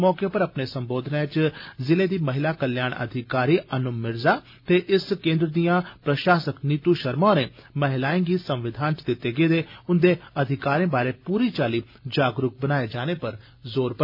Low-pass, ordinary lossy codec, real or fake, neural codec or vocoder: 5.4 kHz; MP3, 48 kbps; real; none